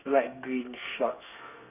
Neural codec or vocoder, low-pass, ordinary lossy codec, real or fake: codec, 32 kHz, 1.9 kbps, SNAC; 3.6 kHz; none; fake